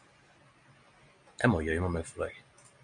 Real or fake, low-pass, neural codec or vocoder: real; 9.9 kHz; none